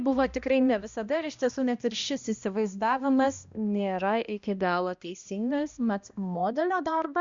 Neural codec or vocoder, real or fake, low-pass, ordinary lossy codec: codec, 16 kHz, 1 kbps, X-Codec, HuBERT features, trained on balanced general audio; fake; 7.2 kHz; Opus, 64 kbps